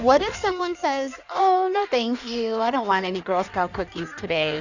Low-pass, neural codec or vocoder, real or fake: 7.2 kHz; codec, 16 kHz in and 24 kHz out, 1.1 kbps, FireRedTTS-2 codec; fake